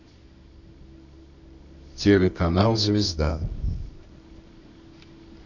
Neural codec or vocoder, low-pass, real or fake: codec, 24 kHz, 0.9 kbps, WavTokenizer, medium music audio release; 7.2 kHz; fake